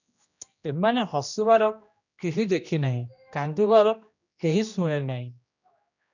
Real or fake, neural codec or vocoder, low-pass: fake; codec, 16 kHz, 1 kbps, X-Codec, HuBERT features, trained on general audio; 7.2 kHz